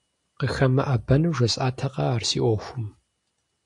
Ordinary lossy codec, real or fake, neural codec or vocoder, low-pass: AAC, 64 kbps; real; none; 10.8 kHz